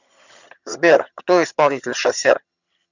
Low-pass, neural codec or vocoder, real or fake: 7.2 kHz; vocoder, 22.05 kHz, 80 mel bands, HiFi-GAN; fake